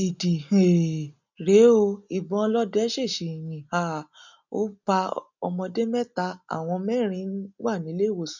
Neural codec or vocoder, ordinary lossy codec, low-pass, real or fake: none; none; 7.2 kHz; real